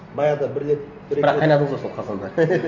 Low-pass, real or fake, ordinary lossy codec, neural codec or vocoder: 7.2 kHz; real; none; none